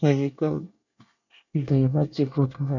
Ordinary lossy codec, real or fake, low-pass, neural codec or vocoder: none; fake; 7.2 kHz; codec, 24 kHz, 1 kbps, SNAC